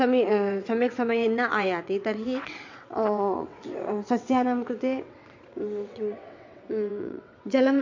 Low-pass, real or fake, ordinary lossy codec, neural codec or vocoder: 7.2 kHz; fake; MP3, 48 kbps; vocoder, 22.05 kHz, 80 mel bands, WaveNeXt